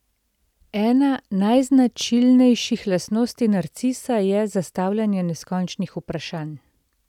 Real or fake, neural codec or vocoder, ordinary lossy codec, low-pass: real; none; none; 19.8 kHz